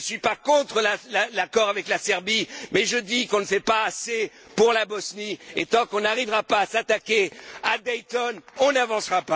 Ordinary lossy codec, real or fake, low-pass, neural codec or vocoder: none; real; none; none